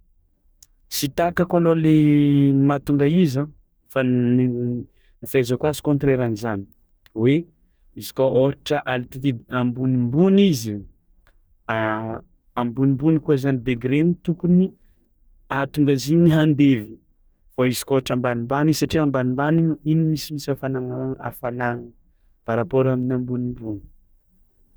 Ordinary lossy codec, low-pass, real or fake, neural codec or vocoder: none; none; fake; codec, 44.1 kHz, 2.6 kbps, DAC